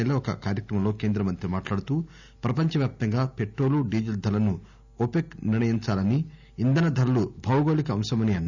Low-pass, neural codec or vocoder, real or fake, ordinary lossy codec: 7.2 kHz; none; real; none